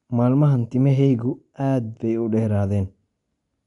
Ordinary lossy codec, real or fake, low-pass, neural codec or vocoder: none; real; 10.8 kHz; none